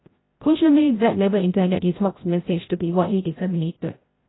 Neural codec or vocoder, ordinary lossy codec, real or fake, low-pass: codec, 16 kHz, 0.5 kbps, FreqCodec, larger model; AAC, 16 kbps; fake; 7.2 kHz